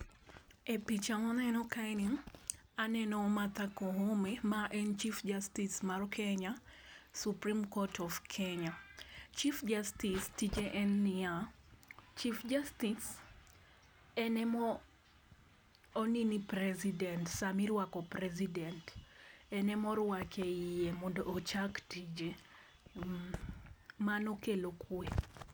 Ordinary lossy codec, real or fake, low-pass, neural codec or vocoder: none; real; none; none